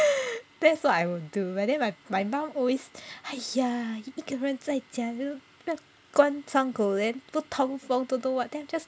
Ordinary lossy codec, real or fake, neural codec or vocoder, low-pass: none; real; none; none